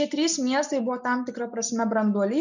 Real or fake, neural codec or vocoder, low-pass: real; none; 7.2 kHz